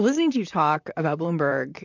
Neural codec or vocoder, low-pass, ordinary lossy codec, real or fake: vocoder, 44.1 kHz, 128 mel bands, Pupu-Vocoder; 7.2 kHz; MP3, 64 kbps; fake